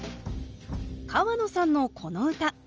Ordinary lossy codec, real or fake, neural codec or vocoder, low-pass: Opus, 24 kbps; real; none; 7.2 kHz